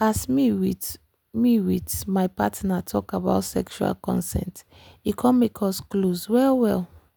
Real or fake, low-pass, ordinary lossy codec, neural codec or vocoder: real; none; none; none